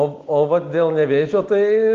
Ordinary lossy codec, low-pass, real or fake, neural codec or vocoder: Opus, 16 kbps; 7.2 kHz; real; none